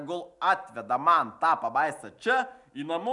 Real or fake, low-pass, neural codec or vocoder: real; 10.8 kHz; none